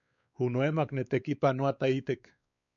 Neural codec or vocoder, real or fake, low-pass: codec, 16 kHz, 4 kbps, X-Codec, WavLM features, trained on Multilingual LibriSpeech; fake; 7.2 kHz